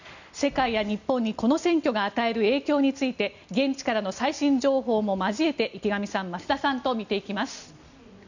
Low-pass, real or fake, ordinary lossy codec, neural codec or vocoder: 7.2 kHz; real; none; none